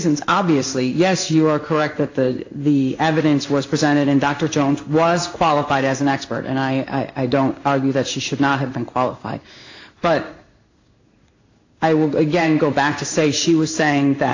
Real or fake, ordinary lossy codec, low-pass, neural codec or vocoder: fake; AAC, 48 kbps; 7.2 kHz; codec, 16 kHz in and 24 kHz out, 1 kbps, XY-Tokenizer